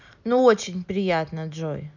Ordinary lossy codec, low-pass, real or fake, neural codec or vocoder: none; 7.2 kHz; real; none